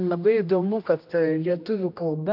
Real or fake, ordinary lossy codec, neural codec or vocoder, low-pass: fake; MP3, 48 kbps; codec, 16 kHz, 1 kbps, X-Codec, HuBERT features, trained on general audio; 5.4 kHz